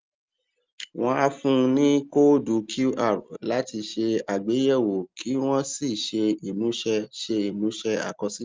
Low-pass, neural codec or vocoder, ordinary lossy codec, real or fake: 7.2 kHz; none; Opus, 32 kbps; real